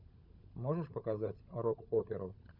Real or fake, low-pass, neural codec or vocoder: fake; 5.4 kHz; codec, 16 kHz, 16 kbps, FunCodec, trained on LibriTTS, 50 frames a second